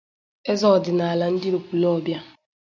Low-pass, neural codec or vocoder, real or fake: 7.2 kHz; none; real